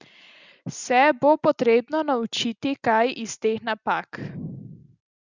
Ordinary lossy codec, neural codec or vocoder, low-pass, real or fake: Opus, 64 kbps; none; 7.2 kHz; real